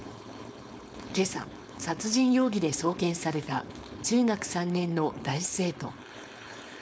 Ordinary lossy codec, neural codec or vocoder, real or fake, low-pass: none; codec, 16 kHz, 4.8 kbps, FACodec; fake; none